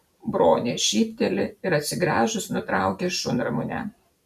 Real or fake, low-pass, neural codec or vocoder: real; 14.4 kHz; none